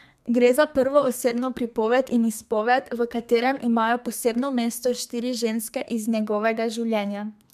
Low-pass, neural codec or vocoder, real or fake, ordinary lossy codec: 14.4 kHz; codec, 32 kHz, 1.9 kbps, SNAC; fake; MP3, 96 kbps